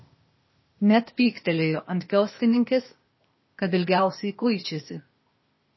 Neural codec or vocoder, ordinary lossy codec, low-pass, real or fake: codec, 16 kHz, 0.8 kbps, ZipCodec; MP3, 24 kbps; 7.2 kHz; fake